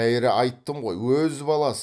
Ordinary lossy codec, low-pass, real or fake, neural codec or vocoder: none; none; real; none